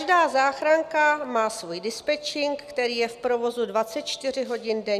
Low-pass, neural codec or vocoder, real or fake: 14.4 kHz; none; real